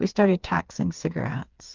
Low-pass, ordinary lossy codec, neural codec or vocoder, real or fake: 7.2 kHz; Opus, 24 kbps; codec, 16 kHz, 4 kbps, FreqCodec, smaller model; fake